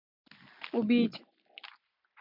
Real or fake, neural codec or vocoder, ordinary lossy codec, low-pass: real; none; MP3, 48 kbps; 5.4 kHz